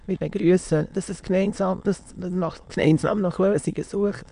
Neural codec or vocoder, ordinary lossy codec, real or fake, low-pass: autoencoder, 22.05 kHz, a latent of 192 numbers a frame, VITS, trained on many speakers; MP3, 64 kbps; fake; 9.9 kHz